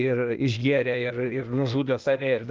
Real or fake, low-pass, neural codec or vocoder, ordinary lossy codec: fake; 7.2 kHz; codec, 16 kHz, 0.8 kbps, ZipCodec; Opus, 32 kbps